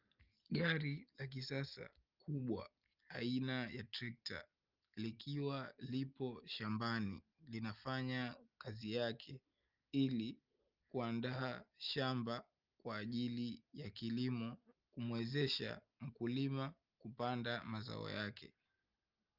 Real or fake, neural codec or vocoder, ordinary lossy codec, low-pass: real; none; Opus, 24 kbps; 5.4 kHz